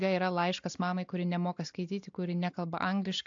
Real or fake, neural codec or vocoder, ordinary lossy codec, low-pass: real; none; AAC, 64 kbps; 7.2 kHz